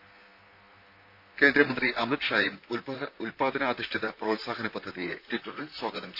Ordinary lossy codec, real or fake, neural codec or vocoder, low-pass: none; fake; vocoder, 44.1 kHz, 128 mel bands, Pupu-Vocoder; 5.4 kHz